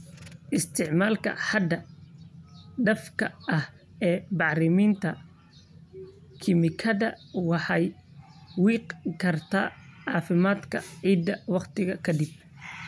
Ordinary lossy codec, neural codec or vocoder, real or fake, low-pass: none; none; real; none